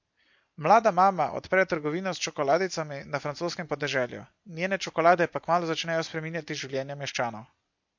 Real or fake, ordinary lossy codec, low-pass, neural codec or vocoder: real; MP3, 48 kbps; 7.2 kHz; none